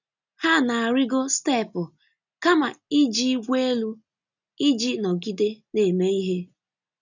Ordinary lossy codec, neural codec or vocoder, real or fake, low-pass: none; none; real; 7.2 kHz